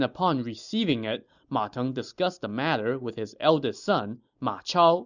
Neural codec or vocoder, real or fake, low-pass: none; real; 7.2 kHz